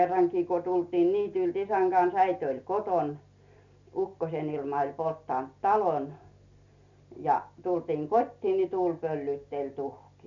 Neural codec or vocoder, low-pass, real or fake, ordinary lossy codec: none; 7.2 kHz; real; none